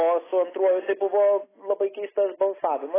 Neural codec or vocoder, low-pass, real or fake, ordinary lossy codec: none; 3.6 kHz; real; AAC, 16 kbps